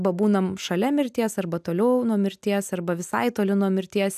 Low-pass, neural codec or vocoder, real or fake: 14.4 kHz; none; real